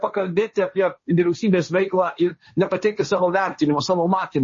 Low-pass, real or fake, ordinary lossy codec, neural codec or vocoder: 7.2 kHz; fake; MP3, 32 kbps; codec, 16 kHz, 1.1 kbps, Voila-Tokenizer